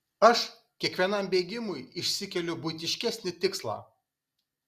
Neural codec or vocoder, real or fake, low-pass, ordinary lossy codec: none; real; 14.4 kHz; Opus, 64 kbps